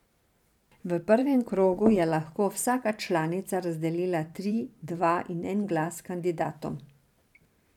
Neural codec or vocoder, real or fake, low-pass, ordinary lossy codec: vocoder, 44.1 kHz, 128 mel bands every 512 samples, BigVGAN v2; fake; 19.8 kHz; none